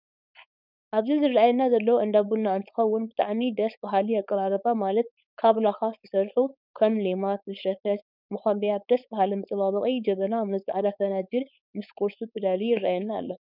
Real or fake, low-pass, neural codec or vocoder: fake; 5.4 kHz; codec, 16 kHz, 4.8 kbps, FACodec